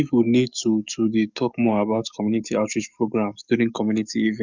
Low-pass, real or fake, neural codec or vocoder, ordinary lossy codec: 7.2 kHz; fake; codec, 44.1 kHz, 7.8 kbps, DAC; Opus, 64 kbps